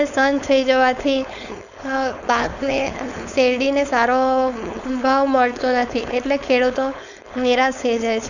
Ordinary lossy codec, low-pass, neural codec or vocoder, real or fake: none; 7.2 kHz; codec, 16 kHz, 4.8 kbps, FACodec; fake